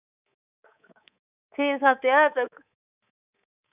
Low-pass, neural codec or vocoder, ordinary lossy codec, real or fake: 3.6 kHz; codec, 24 kHz, 3.1 kbps, DualCodec; none; fake